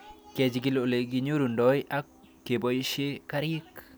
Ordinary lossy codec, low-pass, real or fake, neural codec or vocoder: none; none; real; none